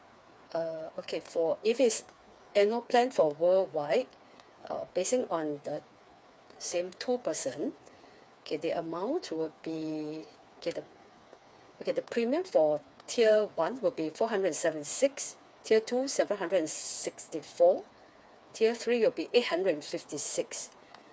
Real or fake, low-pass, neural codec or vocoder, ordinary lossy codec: fake; none; codec, 16 kHz, 4 kbps, FreqCodec, smaller model; none